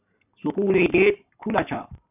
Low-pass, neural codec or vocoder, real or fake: 3.6 kHz; codec, 44.1 kHz, 7.8 kbps, Pupu-Codec; fake